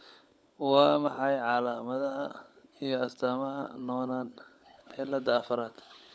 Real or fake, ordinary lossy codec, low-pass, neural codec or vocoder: fake; none; none; codec, 16 kHz, 16 kbps, FunCodec, trained on LibriTTS, 50 frames a second